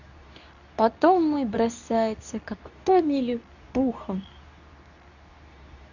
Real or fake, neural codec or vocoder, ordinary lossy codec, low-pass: fake; codec, 24 kHz, 0.9 kbps, WavTokenizer, medium speech release version 2; none; 7.2 kHz